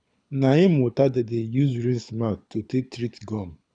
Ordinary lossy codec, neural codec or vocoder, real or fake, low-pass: none; codec, 24 kHz, 6 kbps, HILCodec; fake; 9.9 kHz